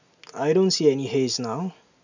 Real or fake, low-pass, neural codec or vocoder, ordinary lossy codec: real; 7.2 kHz; none; none